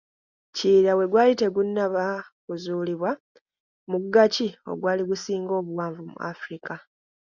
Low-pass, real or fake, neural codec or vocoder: 7.2 kHz; real; none